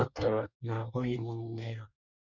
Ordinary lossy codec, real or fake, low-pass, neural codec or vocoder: none; fake; 7.2 kHz; codec, 24 kHz, 1 kbps, SNAC